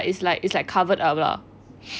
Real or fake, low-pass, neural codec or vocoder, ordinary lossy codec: real; none; none; none